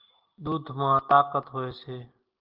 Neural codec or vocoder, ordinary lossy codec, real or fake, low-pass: none; Opus, 16 kbps; real; 5.4 kHz